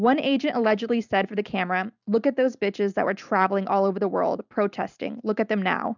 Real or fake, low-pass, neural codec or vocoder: real; 7.2 kHz; none